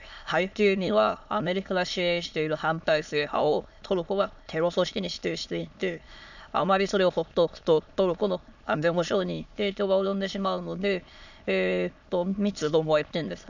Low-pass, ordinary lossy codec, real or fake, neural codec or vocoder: 7.2 kHz; none; fake; autoencoder, 22.05 kHz, a latent of 192 numbers a frame, VITS, trained on many speakers